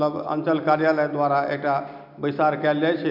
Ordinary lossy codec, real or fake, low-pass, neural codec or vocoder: none; real; 5.4 kHz; none